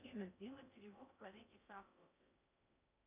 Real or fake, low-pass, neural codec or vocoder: fake; 3.6 kHz; codec, 16 kHz in and 24 kHz out, 0.6 kbps, FocalCodec, streaming, 4096 codes